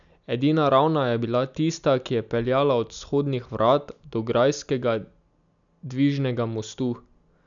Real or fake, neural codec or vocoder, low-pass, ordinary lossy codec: real; none; 7.2 kHz; none